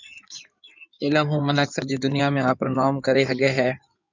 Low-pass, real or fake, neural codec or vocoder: 7.2 kHz; fake; codec, 16 kHz in and 24 kHz out, 2.2 kbps, FireRedTTS-2 codec